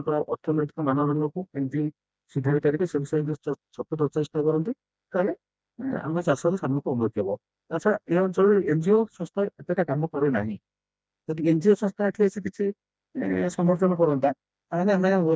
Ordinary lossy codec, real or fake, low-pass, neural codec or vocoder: none; fake; none; codec, 16 kHz, 1 kbps, FreqCodec, smaller model